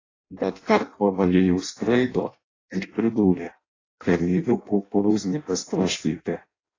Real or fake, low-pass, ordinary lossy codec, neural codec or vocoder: fake; 7.2 kHz; AAC, 32 kbps; codec, 16 kHz in and 24 kHz out, 0.6 kbps, FireRedTTS-2 codec